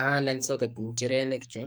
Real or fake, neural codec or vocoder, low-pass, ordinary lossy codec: fake; codec, 44.1 kHz, 2.6 kbps, SNAC; none; none